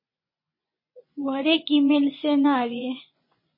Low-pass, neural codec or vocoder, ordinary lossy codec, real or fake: 5.4 kHz; vocoder, 24 kHz, 100 mel bands, Vocos; MP3, 24 kbps; fake